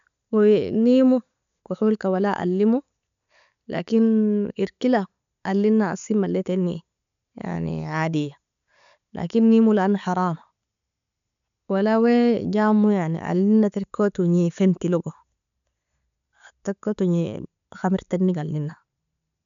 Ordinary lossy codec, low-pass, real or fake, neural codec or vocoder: none; 7.2 kHz; real; none